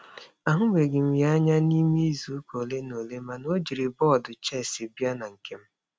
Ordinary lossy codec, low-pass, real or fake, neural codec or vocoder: none; none; real; none